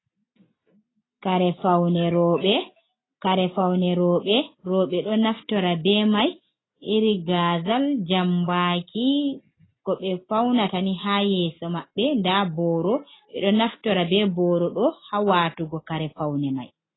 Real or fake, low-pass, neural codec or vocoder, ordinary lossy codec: real; 7.2 kHz; none; AAC, 16 kbps